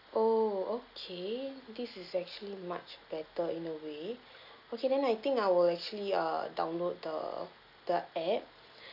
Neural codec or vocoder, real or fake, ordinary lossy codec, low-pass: none; real; none; 5.4 kHz